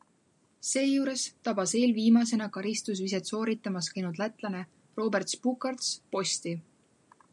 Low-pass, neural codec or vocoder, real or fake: 10.8 kHz; none; real